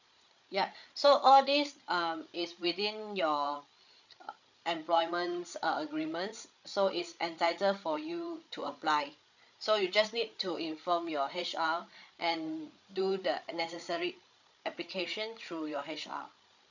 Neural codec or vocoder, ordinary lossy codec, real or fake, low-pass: codec, 16 kHz, 8 kbps, FreqCodec, larger model; none; fake; 7.2 kHz